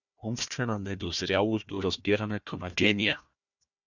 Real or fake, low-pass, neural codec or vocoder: fake; 7.2 kHz; codec, 16 kHz, 1 kbps, FunCodec, trained on Chinese and English, 50 frames a second